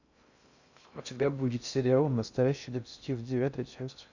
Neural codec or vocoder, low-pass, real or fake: codec, 16 kHz in and 24 kHz out, 0.6 kbps, FocalCodec, streaming, 2048 codes; 7.2 kHz; fake